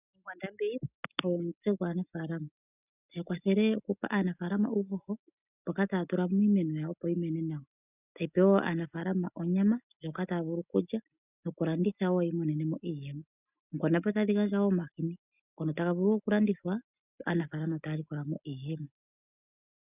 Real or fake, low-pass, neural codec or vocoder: real; 3.6 kHz; none